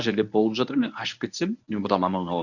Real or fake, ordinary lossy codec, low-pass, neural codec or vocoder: fake; none; 7.2 kHz; codec, 24 kHz, 0.9 kbps, WavTokenizer, medium speech release version 1